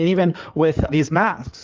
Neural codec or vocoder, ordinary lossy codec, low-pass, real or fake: codec, 16 kHz in and 24 kHz out, 2.2 kbps, FireRedTTS-2 codec; Opus, 32 kbps; 7.2 kHz; fake